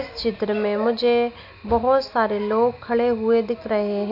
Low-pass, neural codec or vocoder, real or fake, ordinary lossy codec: 5.4 kHz; none; real; AAC, 48 kbps